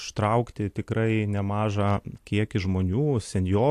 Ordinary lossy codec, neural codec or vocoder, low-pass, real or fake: AAC, 64 kbps; none; 14.4 kHz; real